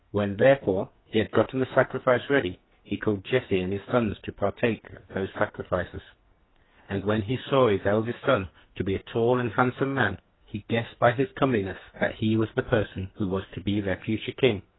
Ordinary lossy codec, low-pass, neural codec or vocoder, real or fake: AAC, 16 kbps; 7.2 kHz; codec, 44.1 kHz, 2.6 kbps, SNAC; fake